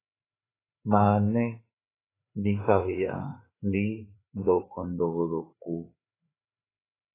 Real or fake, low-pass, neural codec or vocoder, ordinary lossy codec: fake; 3.6 kHz; codec, 16 kHz, 4 kbps, FreqCodec, larger model; AAC, 16 kbps